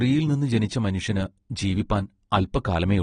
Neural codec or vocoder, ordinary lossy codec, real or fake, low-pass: none; AAC, 32 kbps; real; 9.9 kHz